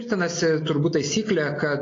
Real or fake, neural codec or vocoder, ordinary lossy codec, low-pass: real; none; AAC, 32 kbps; 7.2 kHz